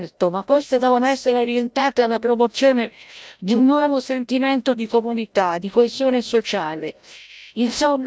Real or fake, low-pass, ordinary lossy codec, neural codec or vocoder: fake; none; none; codec, 16 kHz, 0.5 kbps, FreqCodec, larger model